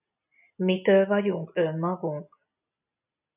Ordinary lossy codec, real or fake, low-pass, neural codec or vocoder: AAC, 32 kbps; real; 3.6 kHz; none